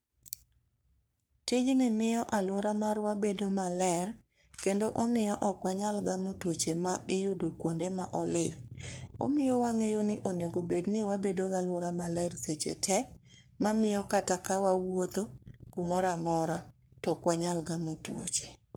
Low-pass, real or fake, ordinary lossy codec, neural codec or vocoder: none; fake; none; codec, 44.1 kHz, 3.4 kbps, Pupu-Codec